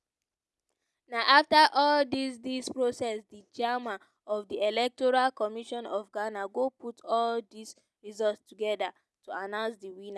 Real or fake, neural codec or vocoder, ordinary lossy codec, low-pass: real; none; none; none